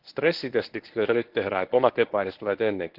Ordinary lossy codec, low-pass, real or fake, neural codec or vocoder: Opus, 16 kbps; 5.4 kHz; fake; codec, 24 kHz, 0.9 kbps, WavTokenizer, medium speech release version 1